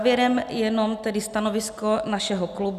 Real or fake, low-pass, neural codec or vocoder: real; 14.4 kHz; none